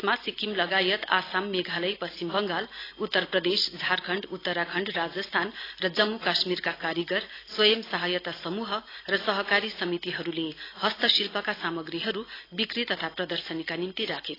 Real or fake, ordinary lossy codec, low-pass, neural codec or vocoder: real; AAC, 24 kbps; 5.4 kHz; none